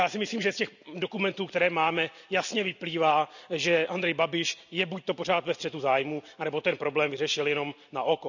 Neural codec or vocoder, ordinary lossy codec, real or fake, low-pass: none; none; real; 7.2 kHz